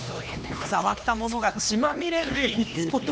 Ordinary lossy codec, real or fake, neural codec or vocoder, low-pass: none; fake; codec, 16 kHz, 2 kbps, X-Codec, HuBERT features, trained on LibriSpeech; none